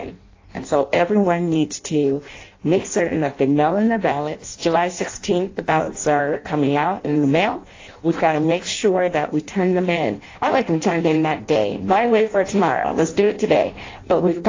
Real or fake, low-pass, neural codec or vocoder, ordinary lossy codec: fake; 7.2 kHz; codec, 16 kHz in and 24 kHz out, 0.6 kbps, FireRedTTS-2 codec; AAC, 32 kbps